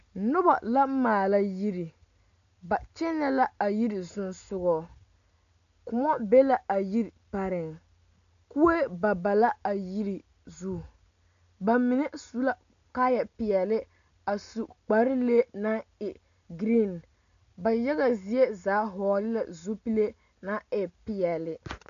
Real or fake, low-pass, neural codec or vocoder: real; 7.2 kHz; none